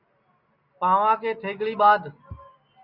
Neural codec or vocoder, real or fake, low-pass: none; real; 5.4 kHz